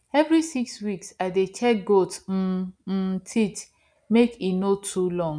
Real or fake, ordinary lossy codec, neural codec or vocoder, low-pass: real; none; none; 9.9 kHz